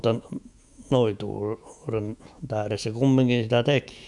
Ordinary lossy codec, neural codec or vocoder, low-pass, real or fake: none; autoencoder, 48 kHz, 128 numbers a frame, DAC-VAE, trained on Japanese speech; 10.8 kHz; fake